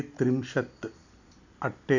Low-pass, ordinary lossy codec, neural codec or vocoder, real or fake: 7.2 kHz; none; none; real